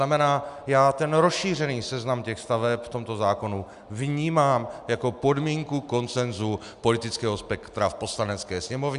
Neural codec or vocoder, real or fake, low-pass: none; real; 10.8 kHz